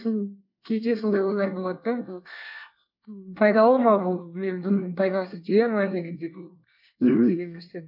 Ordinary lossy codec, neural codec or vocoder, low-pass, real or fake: none; codec, 24 kHz, 1 kbps, SNAC; 5.4 kHz; fake